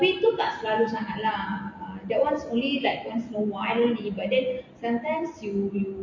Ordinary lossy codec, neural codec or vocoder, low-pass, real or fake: MP3, 48 kbps; none; 7.2 kHz; real